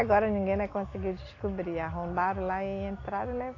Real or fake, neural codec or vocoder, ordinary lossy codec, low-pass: real; none; AAC, 32 kbps; 7.2 kHz